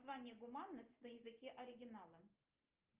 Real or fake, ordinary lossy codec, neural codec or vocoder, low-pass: real; Opus, 32 kbps; none; 3.6 kHz